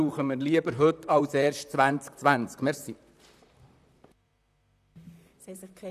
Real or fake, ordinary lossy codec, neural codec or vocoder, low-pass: fake; none; vocoder, 44.1 kHz, 128 mel bands, Pupu-Vocoder; 14.4 kHz